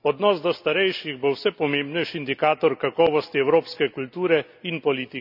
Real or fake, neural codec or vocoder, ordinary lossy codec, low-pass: real; none; none; 5.4 kHz